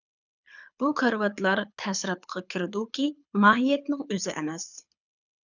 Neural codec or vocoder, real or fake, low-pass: codec, 24 kHz, 6 kbps, HILCodec; fake; 7.2 kHz